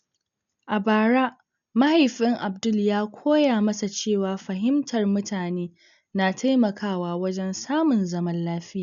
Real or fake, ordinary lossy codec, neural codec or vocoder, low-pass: real; none; none; 7.2 kHz